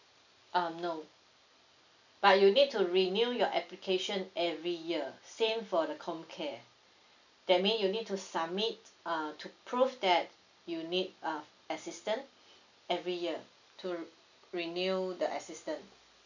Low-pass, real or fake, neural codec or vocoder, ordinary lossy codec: 7.2 kHz; real; none; none